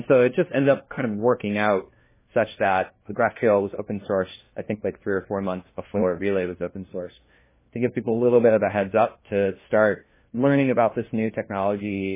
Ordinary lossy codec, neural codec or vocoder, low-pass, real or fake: MP3, 16 kbps; codec, 16 kHz, 1 kbps, FunCodec, trained on LibriTTS, 50 frames a second; 3.6 kHz; fake